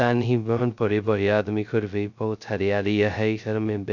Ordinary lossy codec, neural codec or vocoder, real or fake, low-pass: none; codec, 16 kHz, 0.2 kbps, FocalCodec; fake; 7.2 kHz